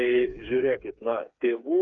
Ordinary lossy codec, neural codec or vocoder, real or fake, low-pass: Opus, 64 kbps; codec, 16 kHz, 4 kbps, FreqCodec, smaller model; fake; 7.2 kHz